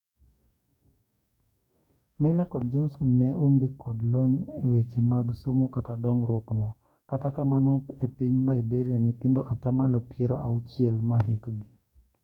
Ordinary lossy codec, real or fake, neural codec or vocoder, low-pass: none; fake; codec, 44.1 kHz, 2.6 kbps, DAC; 19.8 kHz